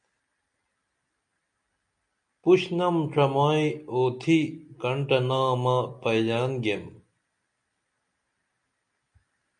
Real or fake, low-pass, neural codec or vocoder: real; 9.9 kHz; none